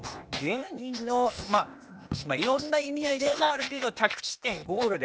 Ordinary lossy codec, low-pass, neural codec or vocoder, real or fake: none; none; codec, 16 kHz, 0.8 kbps, ZipCodec; fake